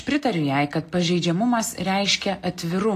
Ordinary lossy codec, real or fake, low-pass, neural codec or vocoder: AAC, 48 kbps; real; 14.4 kHz; none